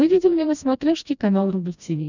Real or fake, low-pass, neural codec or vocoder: fake; 7.2 kHz; codec, 16 kHz, 1 kbps, FreqCodec, smaller model